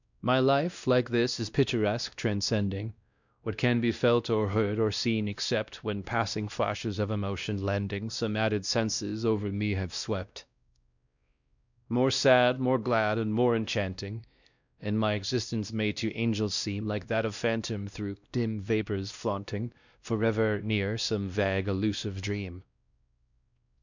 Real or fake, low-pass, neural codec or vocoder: fake; 7.2 kHz; codec, 16 kHz, 1 kbps, X-Codec, WavLM features, trained on Multilingual LibriSpeech